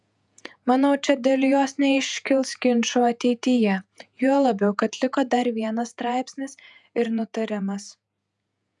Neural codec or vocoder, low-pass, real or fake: vocoder, 48 kHz, 128 mel bands, Vocos; 10.8 kHz; fake